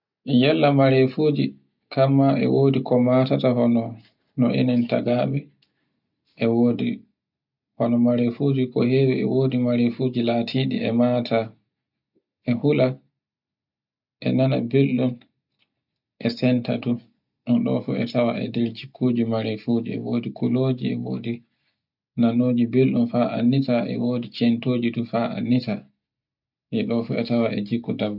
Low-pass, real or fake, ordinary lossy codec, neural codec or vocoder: 5.4 kHz; real; none; none